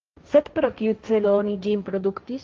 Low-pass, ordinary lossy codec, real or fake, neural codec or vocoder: 7.2 kHz; Opus, 24 kbps; fake; codec, 16 kHz, 1.1 kbps, Voila-Tokenizer